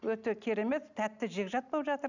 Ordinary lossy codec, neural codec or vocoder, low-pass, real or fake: none; none; 7.2 kHz; real